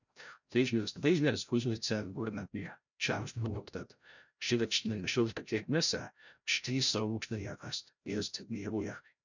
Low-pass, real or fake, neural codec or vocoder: 7.2 kHz; fake; codec, 16 kHz, 0.5 kbps, FreqCodec, larger model